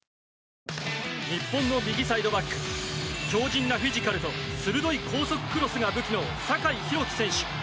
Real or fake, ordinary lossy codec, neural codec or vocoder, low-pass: real; none; none; none